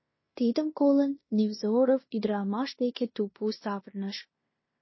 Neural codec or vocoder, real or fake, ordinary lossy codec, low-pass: codec, 16 kHz in and 24 kHz out, 0.9 kbps, LongCat-Audio-Codec, fine tuned four codebook decoder; fake; MP3, 24 kbps; 7.2 kHz